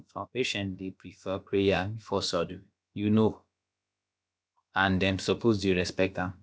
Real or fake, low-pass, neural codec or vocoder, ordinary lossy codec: fake; none; codec, 16 kHz, about 1 kbps, DyCAST, with the encoder's durations; none